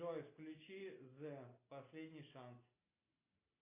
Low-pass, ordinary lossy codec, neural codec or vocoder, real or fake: 3.6 kHz; AAC, 24 kbps; none; real